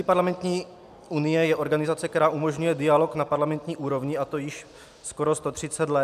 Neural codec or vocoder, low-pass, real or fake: none; 14.4 kHz; real